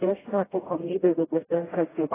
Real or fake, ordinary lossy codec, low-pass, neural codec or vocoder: fake; AAC, 16 kbps; 3.6 kHz; codec, 16 kHz, 0.5 kbps, FreqCodec, smaller model